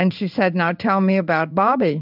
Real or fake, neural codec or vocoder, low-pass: fake; codec, 16 kHz in and 24 kHz out, 1 kbps, XY-Tokenizer; 5.4 kHz